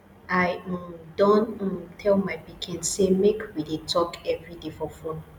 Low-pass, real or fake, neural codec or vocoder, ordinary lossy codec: 19.8 kHz; real; none; none